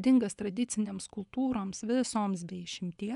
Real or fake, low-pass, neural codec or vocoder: fake; 10.8 kHz; vocoder, 24 kHz, 100 mel bands, Vocos